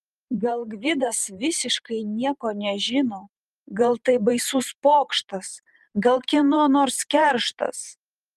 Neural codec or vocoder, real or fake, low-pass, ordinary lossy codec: vocoder, 44.1 kHz, 128 mel bands every 512 samples, BigVGAN v2; fake; 14.4 kHz; Opus, 32 kbps